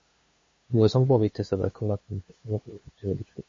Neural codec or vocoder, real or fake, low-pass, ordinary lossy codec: codec, 16 kHz, 2 kbps, FunCodec, trained on LibriTTS, 25 frames a second; fake; 7.2 kHz; MP3, 32 kbps